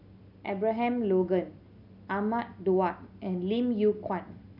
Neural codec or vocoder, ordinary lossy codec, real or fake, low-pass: none; none; real; 5.4 kHz